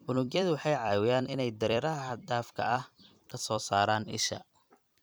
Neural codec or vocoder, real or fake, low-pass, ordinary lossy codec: vocoder, 44.1 kHz, 128 mel bands every 256 samples, BigVGAN v2; fake; none; none